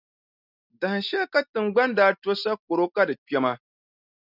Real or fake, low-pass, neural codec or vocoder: real; 5.4 kHz; none